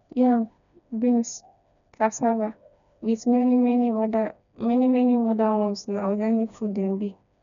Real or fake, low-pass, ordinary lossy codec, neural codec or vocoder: fake; 7.2 kHz; none; codec, 16 kHz, 2 kbps, FreqCodec, smaller model